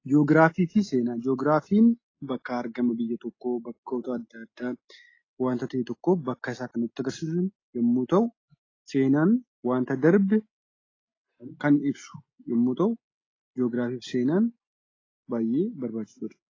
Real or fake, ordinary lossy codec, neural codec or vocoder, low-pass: real; AAC, 32 kbps; none; 7.2 kHz